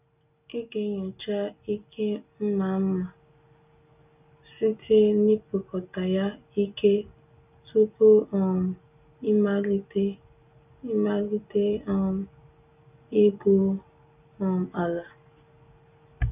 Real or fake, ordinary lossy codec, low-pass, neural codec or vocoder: real; none; 3.6 kHz; none